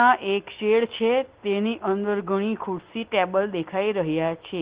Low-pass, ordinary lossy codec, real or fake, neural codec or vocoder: 3.6 kHz; Opus, 16 kbps; real; none